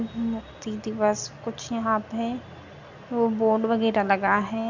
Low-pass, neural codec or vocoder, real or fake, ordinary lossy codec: 7.2 kHz; none; real; none